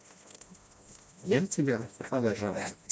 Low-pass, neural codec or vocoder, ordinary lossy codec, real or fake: none; codec, 16 kHz, 1 kbps, FreqCodec, smaller model; none; fake